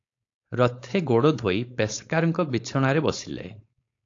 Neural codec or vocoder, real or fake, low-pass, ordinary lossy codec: codec, 16 kHz, 4.8 kbps, FACodec; fake; 7.2 kHz; AAC, 48 kbps